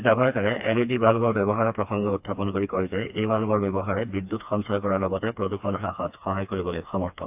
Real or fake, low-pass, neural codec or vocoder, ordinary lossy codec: fake; 3.6 kHz; codec, 16 kHz, 2 kbps, FreqCodec, smaller model; AAC, 32 kbps